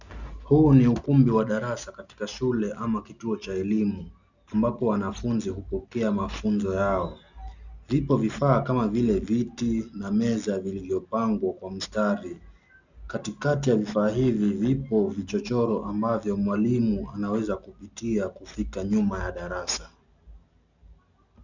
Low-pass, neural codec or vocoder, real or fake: 7.2 kHz; none; real